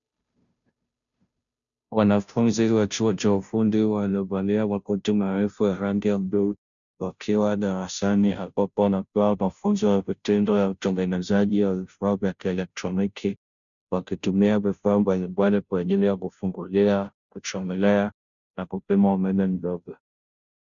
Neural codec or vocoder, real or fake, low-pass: codec, 16 kHz, 0.5 kbps, FunCodec, trained on Chinese and English, 25 frames a second; fake; 7.2 kHz